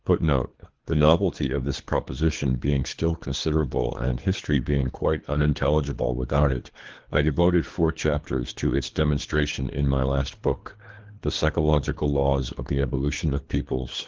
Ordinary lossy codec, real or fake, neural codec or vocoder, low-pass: Opus, 16 kbps; fake; codec, 24 kHz, 3 kbps, HILCodec; 7.2 kHz